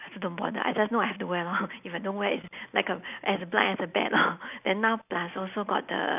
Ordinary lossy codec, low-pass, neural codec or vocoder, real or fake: none; 3.6 kHz; none; real